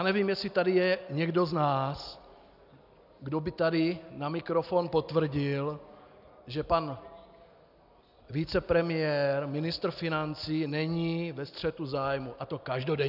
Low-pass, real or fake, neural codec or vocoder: 5.4 kHz; real; none